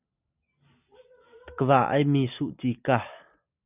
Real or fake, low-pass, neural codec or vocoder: real; 3.6 kHz; none